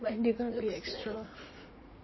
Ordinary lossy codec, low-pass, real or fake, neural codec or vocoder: MP3, 24 kbps; 7.2 kHz; fake; vocoder, 44.1 kHz, 80 mel bands, Vocos